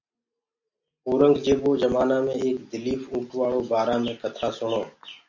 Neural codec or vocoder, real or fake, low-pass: none; real; 7.2 kHz